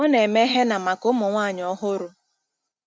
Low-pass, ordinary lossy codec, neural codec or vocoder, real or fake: none; none; none; real